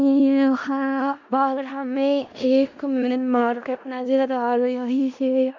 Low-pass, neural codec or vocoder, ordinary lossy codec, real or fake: 7.2 kHz; codec, 16 kHz in and 24 kHz out, 0.4 kbps, LongCat-Audio-Codec, four codebook decoder; MP3, 64 kbps; fake